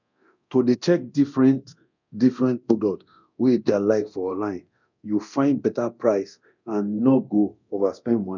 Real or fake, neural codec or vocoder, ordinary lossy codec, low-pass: fake; codec, 24 kHz, 0.9 kbps, DualCodec; none; 7.2 kHz